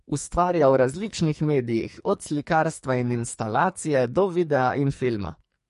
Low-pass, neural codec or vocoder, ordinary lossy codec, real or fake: 14.4 kHz; codec, 44.1 kHz, 2.6 kbps, SNAC; MP3, 48 kbps; fake